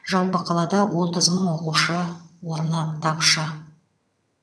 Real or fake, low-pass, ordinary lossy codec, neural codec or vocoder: fake; none; none; vocoder, 22.05 kHz, 80 mel bands, HiFi-GAN